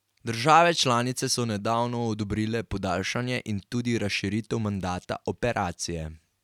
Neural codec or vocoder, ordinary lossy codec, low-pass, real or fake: none; none; 19.8 kHz; real